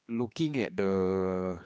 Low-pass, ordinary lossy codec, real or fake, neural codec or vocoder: none; none; fake; codec, 16 kHz, 2 kbps, X-Codec, HuBERT features, trained on general audio